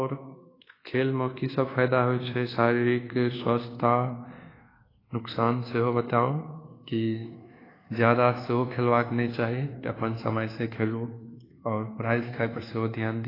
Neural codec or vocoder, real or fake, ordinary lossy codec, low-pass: codec, 24 kHz, 1.2 kbps, DualCodec; fake; AAC, 24 kbps; 5.4 kHz